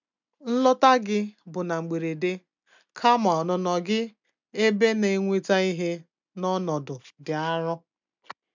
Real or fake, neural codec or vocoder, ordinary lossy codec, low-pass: real; none; none; 7.2 kHz